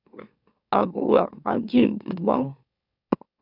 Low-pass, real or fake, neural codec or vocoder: 5.4 kHz; fake; autoencoder, 44.1 kHz, a latent of 192 numbers a frame, MeloTTS